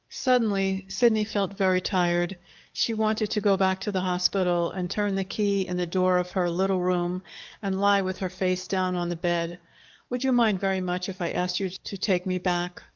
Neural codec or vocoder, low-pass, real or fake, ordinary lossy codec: codec, 44.1 kHz, 7.8 kbps, DAC; 7.2 kHz; fake; Opus, 32 kbps